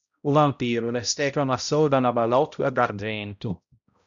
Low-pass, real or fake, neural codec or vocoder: 7.2 kHz; fake; codec, 16 kHz, 0.5 kbps, X-Codec, HuBERT features, trained on balanced general audio